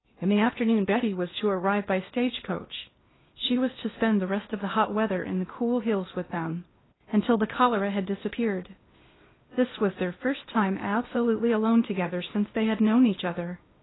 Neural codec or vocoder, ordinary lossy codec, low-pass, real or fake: codec, 16 kHz in and 24 kHz out, 0.8 kbps, FocalCodec, streaming, 65536 codes; AAC, 16 kbps; 7.2 kHz; fake